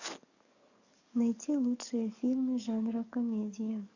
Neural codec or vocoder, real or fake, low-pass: codec, 44.1 kHz, 7.8 kbps, DAC; fake; 7.2 kHz